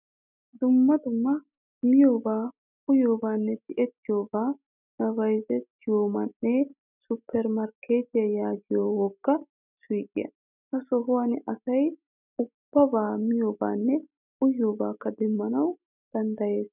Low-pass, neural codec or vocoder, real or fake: 3.6 kHz; none; real